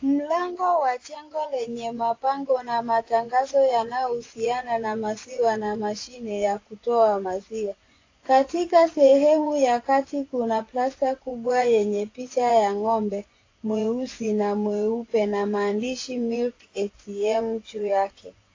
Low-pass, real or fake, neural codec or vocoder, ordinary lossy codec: 7.2 kHz; fake; vocoder, 24 kHz, 100 mel bands, Vocos; AAC, 32 kbps